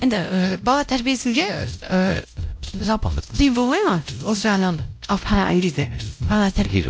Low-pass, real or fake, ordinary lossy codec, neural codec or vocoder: none; fake; none; codec, 16 kHz, 0.5 kbps, X-Codec, WavLM features, trained on Multilingual LibriSpeech